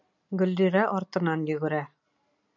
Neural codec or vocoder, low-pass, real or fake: none; 7.2 kHz; real